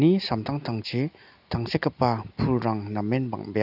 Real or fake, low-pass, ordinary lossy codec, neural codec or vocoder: real; 5.4 kHz; none; none